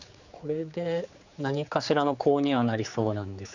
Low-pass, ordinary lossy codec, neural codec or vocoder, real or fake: 7.2 kHz; none; codec, 16 kHz, 4 kbps, X-Codec, HuBERT features, trained on general audio; fake